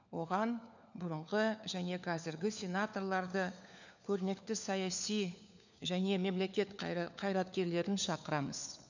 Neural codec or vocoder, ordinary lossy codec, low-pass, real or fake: codec, 16 kHz, 4 kbps, FunCodec, trained on LibriTTS, 50 frames a second; none; 7.2 kHz; fake